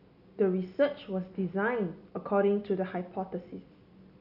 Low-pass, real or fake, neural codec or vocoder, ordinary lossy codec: 5.4 kHz; real; none; none